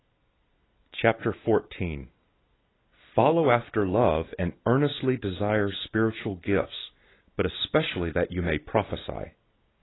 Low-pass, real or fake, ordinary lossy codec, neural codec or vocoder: 7.2 kHz; real; AAC, 16 kbps; none